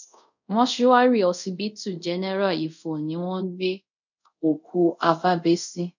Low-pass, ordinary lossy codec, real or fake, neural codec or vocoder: 7.2 kHz; none; fake; codec, 24 kHz, 0.5 kbps, DualCodec